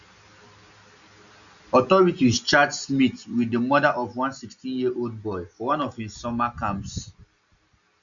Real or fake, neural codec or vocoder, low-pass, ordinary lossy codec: real; none; 7.2 kHz; none